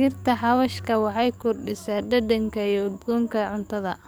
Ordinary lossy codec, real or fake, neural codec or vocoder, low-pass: none; fake; codec, 44.1 kHz, 7.8 kbps, DAC; none